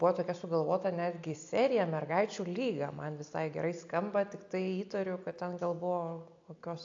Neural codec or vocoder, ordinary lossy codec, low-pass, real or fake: none; AAC, 48 kbps; 7.2 kHz; real